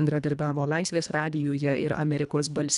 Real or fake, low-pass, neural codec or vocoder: fake; 10.8 kHz; codec, 24 kHz, 1.5 kbps, HILCodec